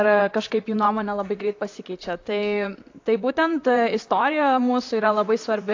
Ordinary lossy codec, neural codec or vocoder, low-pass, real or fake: AAC, 48 kbps; vocoder, 44.1 kHz, 128 mel bands, Pupu-Vocoder; 7.2 kHz; fake